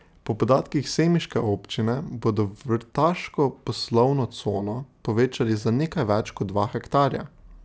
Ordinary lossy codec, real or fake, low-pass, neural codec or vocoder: none; real; none; none